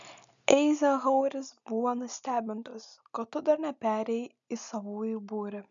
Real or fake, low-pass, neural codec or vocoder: real; 7.2 kHz; none